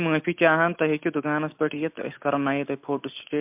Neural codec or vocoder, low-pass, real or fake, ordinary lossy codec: none; 3.6 kHz; real; MP3, 32 kbps